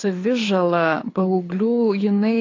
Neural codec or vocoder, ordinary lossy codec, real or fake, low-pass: codec, 24 kHz, 6 kbps, HILCodec; AAC, 32 kbps; fake; 7.2 kHz